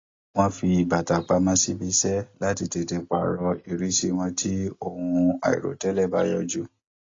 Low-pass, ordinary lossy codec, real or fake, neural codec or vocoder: 7.2 kHz; AAC, 32 kbps; real; none